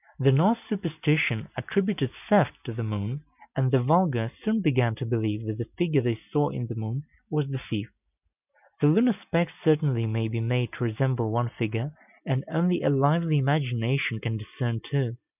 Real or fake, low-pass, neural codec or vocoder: real; 3.6 kHz; none